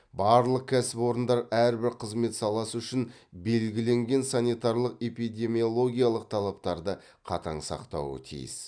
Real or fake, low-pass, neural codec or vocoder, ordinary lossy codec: real; 9.9 kHz; none; none